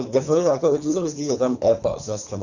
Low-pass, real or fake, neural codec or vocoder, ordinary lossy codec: 7.2 kHz; fake; codec, 24 kHz, 3 kbps, HILCodec; none